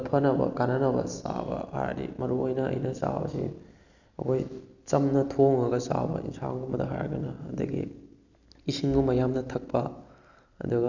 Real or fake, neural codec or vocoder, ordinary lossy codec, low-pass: real; none; none; 7.2 kHz